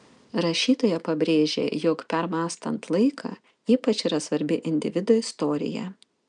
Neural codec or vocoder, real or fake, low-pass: none; real; 9.9 kHz